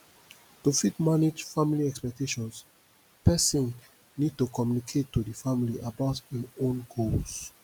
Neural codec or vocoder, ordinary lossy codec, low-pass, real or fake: none; none; none; real